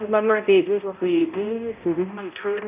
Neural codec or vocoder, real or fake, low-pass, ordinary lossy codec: codec, 16 kHz, 0.5 kbps, X-Codec, HuBERT features, trained on balanced general audio; fake; 3.6 kHz; none